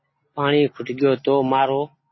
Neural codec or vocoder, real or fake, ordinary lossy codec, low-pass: none; real; MP3, 24 kbps; 7.2 kHz